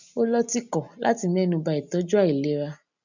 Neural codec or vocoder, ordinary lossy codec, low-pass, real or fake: none; none; 7.2 kHz; real